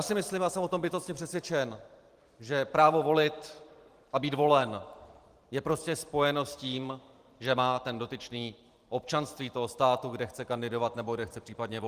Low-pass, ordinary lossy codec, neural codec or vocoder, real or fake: 14.4 kHz; Opus, 24 kbps; vocoder, 44.1 kHz, 128 mel bands every 512 samples, BigVGAN v2; fake